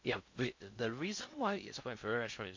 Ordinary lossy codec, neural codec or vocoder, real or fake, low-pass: MP3, 48 kbps; codec, 16 kHz in and 24 kHz out, 0.6 kbps, FocalCodec, streaming, 4096 codes; fake; 7.2 kHz